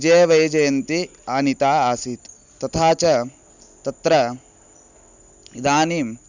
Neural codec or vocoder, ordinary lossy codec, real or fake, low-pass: none; none; real; 7.2 kHz